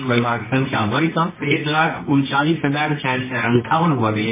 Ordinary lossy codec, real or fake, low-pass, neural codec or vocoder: MP3, 16 kbps; fake; 3.6 kHz; codec, 24 kHz, 0.9 kbps, WavTokenizer, medium music audio release